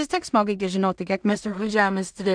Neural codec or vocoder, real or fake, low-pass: codec, 16 kHz in and 24 kHz out, 0.4 kbps, LongCat-Audio-Codec, two codebook decoder; fake; 9.9 kHz